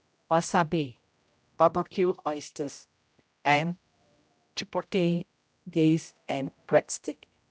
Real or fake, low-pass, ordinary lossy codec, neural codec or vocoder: fake; none; none; codec, 16 kHz, 0.5 kbps, X-Codec, HuBERT features, trained on general audio